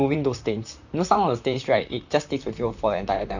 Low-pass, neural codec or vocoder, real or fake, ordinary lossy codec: 7.2 kHz; vocoder, 44.1 kHz, 128 mel bands, Pupu-Vocoder; fake; none